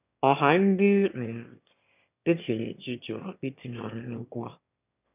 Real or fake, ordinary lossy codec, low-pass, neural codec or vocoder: fake; none; 3.6 kHz; autoencoder, 22.05 kHz, a latent of 192 numbers a frame, VITS, trained on one speaker